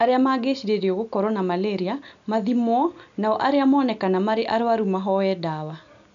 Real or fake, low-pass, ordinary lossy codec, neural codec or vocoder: real; 7.2 kHz; none; none